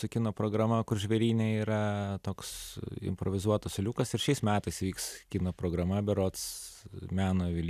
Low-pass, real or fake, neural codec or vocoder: 14.4 kHz; real; none